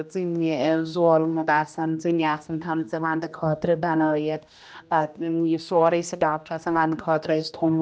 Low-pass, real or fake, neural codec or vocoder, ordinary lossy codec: none; fake; codec, 16 kHz, 1 kbps, X-Codec, HuBERT features, trained on general audio; none